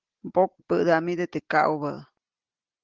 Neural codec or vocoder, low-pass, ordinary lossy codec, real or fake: none; 7.2 kHz; Opus, 16 kbps; real